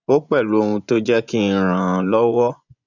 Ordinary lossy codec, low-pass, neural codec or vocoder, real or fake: none; 7.2 kHz; vocoder, 24 kHz, 100 mel bands, Vocos; fake